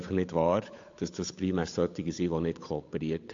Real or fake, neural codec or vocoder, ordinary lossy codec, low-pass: fake; codec, 16 kHz, 8 kbps, FunCodec, trained on Chinese and English, 25 frames a second; AAC, 48 kbps; 7.2 kHz